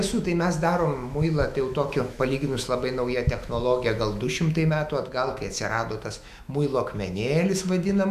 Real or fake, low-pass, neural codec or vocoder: fake; 14.4 kHz; autoencoder, 48 kHz, 128 numbers a frame, DAC-VAE, trained on Japanese speech